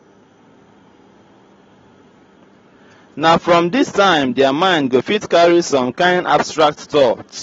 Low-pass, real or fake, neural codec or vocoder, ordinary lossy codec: 7.2 kHz; real; none; AAC, 24 kbps